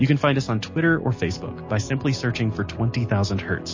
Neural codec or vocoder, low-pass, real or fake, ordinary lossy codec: none; 7.2 kHz; real; MP3, 32 kbps